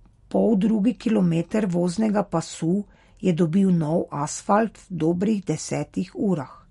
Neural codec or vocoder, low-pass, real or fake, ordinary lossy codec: none; 14.4 kHz; real; MP3, 48 kbps